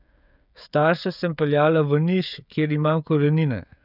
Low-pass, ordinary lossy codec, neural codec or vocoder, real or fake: 5.4 kHz; none; codec, 16 kHz, 16 kbps, FreqCodec, smaller model; fake